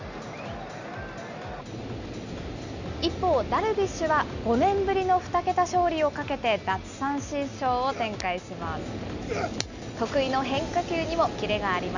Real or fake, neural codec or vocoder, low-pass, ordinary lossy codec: real; none; 7.2 kHz; Opus, 64 kbps